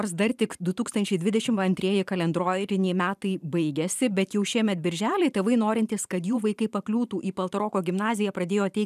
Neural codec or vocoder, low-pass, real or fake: vocoder, 44.1 kHz, 128 mel bands every 512 samples, BigVGAN v2; 14.4 kHz; fake